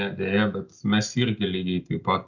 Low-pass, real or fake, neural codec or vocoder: 7.2 kHz; real; none